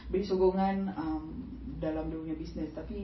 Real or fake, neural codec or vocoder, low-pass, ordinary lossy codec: real; none; 7.2 kHz; MP3, 24 kbps